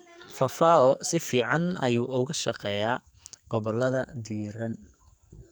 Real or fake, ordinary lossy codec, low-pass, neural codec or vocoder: fake; none; none; codec, 44.1 kHz, 2.6 kbps, SNAC